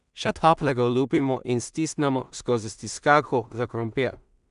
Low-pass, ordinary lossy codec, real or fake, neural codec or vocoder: 10.8 kHz; none; fake; codec, 16 kHz in and 24 kHz out, 0.4 kbps, LongCat-Audio-Codec, two codebook decoder